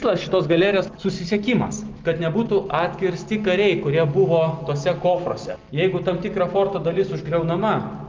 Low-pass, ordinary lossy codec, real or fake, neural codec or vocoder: 7.2 kHz; Opus, 24 kbps; real; none